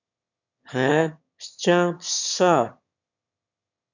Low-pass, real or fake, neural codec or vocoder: 7.2 kHz; fake; autoencoder, 22.05 kHz, a latent of 192 numbers a frame, VITS, trained on one speaker